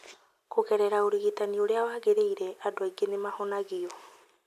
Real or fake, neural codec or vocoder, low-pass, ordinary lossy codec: real; none; 14.4 kHz; none